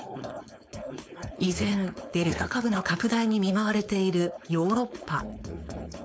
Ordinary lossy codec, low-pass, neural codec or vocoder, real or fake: none; none; codec, 16 kHz, 4.8 kbps, FACodec; fake